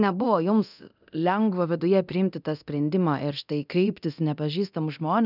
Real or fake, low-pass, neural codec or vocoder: fake; 5.4 kHz; codec, 24 kHz, 0.9 kbps, DualCodec